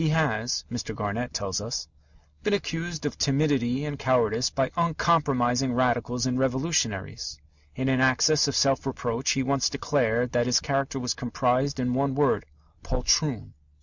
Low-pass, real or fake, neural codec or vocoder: 7.2 kHz; real; none